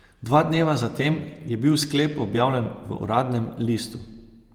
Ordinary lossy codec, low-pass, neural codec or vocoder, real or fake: Opus, 32 kbps; 19.8 kHz; vocoder, 48 kHz, 128 mel bands, Vocos; fake